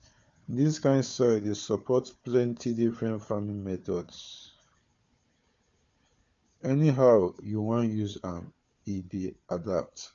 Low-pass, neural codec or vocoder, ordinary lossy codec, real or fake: 7.2 kHz; codec, 16 kHz, 4 kbps, FunCodec, trained on Chinese and English, 50 frames a second; MP3, 48 kbps; fake